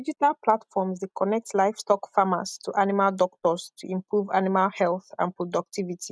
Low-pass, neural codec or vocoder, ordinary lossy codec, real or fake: none; none; none; real